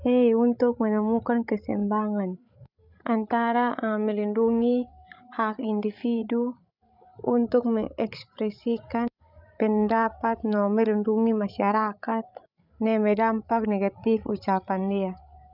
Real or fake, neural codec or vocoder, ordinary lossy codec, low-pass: fake; codec, 16 kHz, 16 kbps, FreqCodec, larger model; none; 5.4 kHz